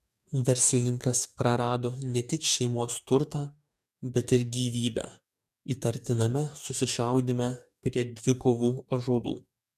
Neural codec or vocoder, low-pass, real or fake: codec, 44.1 kHz, 2.6 kbps, DAC; 14.4 kHz; fake